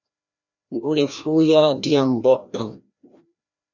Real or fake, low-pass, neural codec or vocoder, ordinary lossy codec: fake; 7.2 kHz; codec, 16 kHz, 1 kbps, FreqCodec, larger model; Opus, 64 kbps